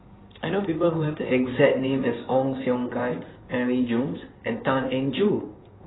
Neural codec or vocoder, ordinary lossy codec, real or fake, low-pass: codec, 16 kHz in and 24 kHz out, 2.2 kbps, FireRedTTS-2 codec; AAC, 16 kbps; fake; 7.2 kHz